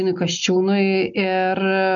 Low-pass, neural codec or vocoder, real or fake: 7.2 kHz; none; real